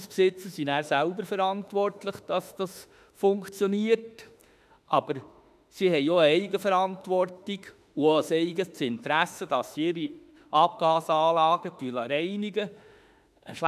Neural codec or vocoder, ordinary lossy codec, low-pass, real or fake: autoencoder, 48 kHz, 32 numbers a frame, DAC-VAE, trained on Japanese speech; none; 14.4 kHz; fake